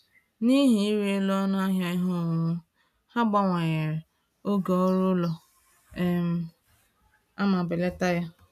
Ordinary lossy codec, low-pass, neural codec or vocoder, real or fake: none; 14.4 kHz; none; real